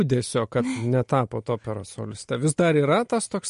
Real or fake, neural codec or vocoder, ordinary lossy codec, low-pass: real; none; MP3, 48 kbps; 10.8 kHz